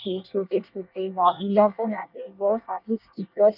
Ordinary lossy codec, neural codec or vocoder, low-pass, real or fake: none; codec, 24 kHz, 1 kbps, SNAC; 5.4 kHz; fake